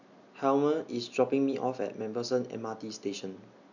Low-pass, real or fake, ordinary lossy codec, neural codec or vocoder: 7.2 kHz; real; none; none